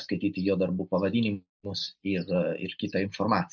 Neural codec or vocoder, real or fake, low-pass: none; real; 7.2 kHz